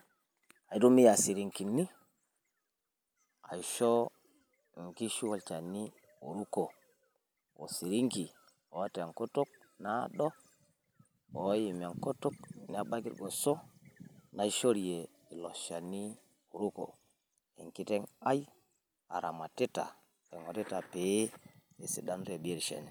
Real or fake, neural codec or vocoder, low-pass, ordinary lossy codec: real; none; none; none